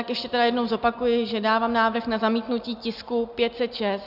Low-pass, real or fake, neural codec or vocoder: 5.4 kHz; real; none